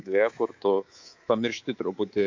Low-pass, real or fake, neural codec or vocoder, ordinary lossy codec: 7.2 kHz; fake; codec, 24 kHz, 3.1 kbps, DualCodec; AAC, 48 kbps